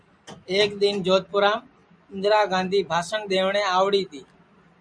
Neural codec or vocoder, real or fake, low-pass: none; real; 9.9 kHz